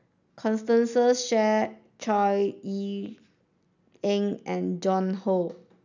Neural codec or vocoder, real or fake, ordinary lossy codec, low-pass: none; real; none; 7.2 kHz